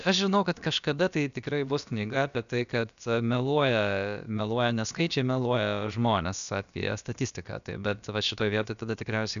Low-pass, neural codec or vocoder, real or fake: 7.2 kHz; codec, 16 kHz, about 1 kbps, DyCAST, with the encoder's durations; fake